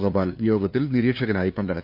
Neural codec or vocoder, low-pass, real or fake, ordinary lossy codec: codec, 16 kHz, 2 kbps, FunCodec, trained on LibriTTS, 25 frames a second; 5.4 kHz; fake; Opus, 64 kbps